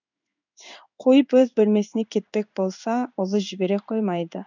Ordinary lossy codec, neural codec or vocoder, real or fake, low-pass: none; codec, 16 kHz in and 24 kHz out, 1 kbps, XY-Tokenizer; fake; 7.2 kHz